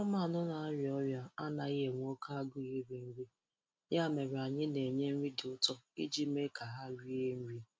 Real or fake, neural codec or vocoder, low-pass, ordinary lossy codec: real; none; none; none